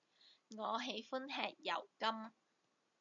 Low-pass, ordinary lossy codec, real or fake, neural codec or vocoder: 7.2 kHz; MP3, 48 kbps; real; none